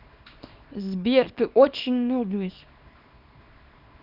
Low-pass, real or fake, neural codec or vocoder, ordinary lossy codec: 5.4 kHz; fake; codec, 24 kHz, 0.9 kbps, WavTokenizer, small release; none